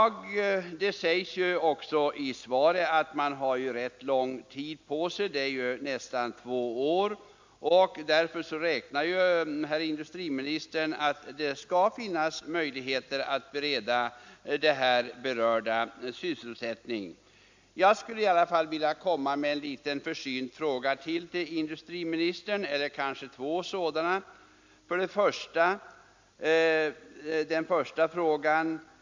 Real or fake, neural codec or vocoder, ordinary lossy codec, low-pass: real; none; MP3, 64 kbps; 7.2 kHz